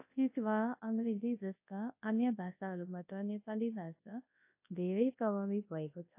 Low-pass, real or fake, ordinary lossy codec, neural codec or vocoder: 3.6 kHz; fake; AAC, 32 kbps; codec, 24 kHz, 0.9 kbps, WavTokenizer, large speech release